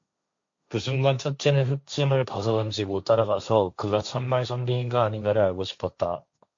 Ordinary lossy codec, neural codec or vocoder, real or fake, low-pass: MP3, 48 kbps; codec, 16 kHz, 1.1 kbps, Voila-Tokenizer; fake; 7.2 kHz